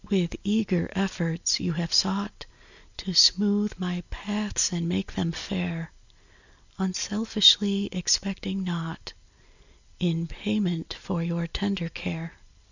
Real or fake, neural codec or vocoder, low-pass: real; none; 7.2 kHz